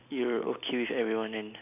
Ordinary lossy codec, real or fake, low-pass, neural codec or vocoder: none; real; 3.6 kHz; none